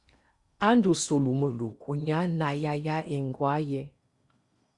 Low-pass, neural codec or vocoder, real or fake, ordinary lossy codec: 10.8 kHz; codec, 16 kHz in and 24 kHz out, 0.8 kbps, FocalCodec, streaming, 65536 codes; fake; Opus, 64 kbps